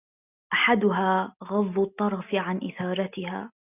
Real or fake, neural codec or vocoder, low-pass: real; none; 3.6 kHz